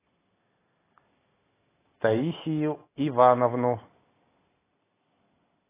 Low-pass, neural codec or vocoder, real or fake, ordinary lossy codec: 3.6 kHz; none; real; AAC, 16 kbps